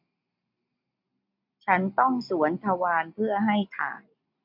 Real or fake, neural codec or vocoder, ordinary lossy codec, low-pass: real; none; MP3, 48 kbps; 5.4 kHz